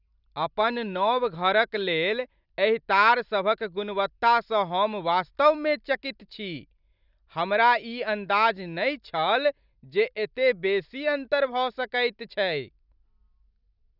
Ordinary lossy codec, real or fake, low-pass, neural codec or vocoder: none; real; 5.4 kHz; none